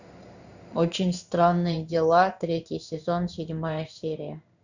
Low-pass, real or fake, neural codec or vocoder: 7.2 kHz; fake; codec, 16 kHz in and 24 kHz out, 1 kbps, XY-Tokenizer